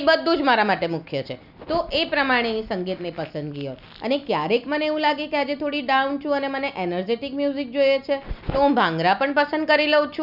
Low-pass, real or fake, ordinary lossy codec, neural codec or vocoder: 5.4 kHz; real; none; none